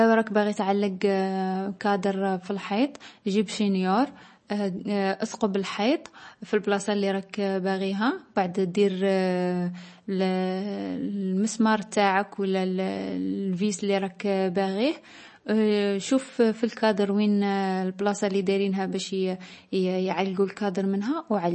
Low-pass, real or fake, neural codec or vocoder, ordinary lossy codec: 10.8 kHz; real; none; MP3, 32 kbps